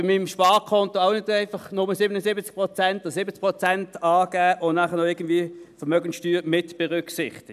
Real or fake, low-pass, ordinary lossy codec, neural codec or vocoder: real; 14.4 kHz; none; none